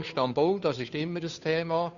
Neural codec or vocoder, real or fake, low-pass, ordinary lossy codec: codec, 16 kHz, 2 kbps, FunCodec, trained on Chinese and English, 25 frames a second; fake; 7.2 kHz; none